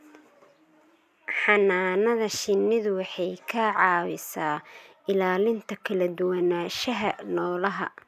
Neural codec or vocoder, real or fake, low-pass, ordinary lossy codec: none; real; 14.4 kHz; none